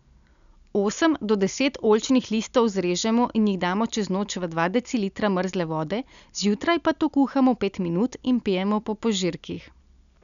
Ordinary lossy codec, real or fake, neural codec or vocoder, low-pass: none; real; none; 7.2 kHz